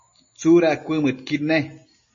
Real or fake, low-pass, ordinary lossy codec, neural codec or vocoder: real; 7.2 kHz; MP3, 32 kbps; none